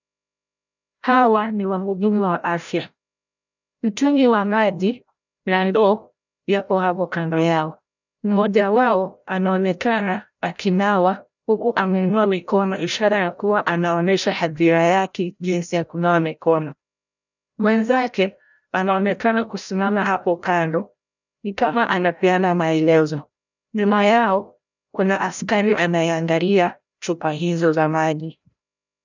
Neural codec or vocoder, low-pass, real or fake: codec, 16 kHz, 0.5 kbps, FreqCodec, larger model; 7.2 kHz; fake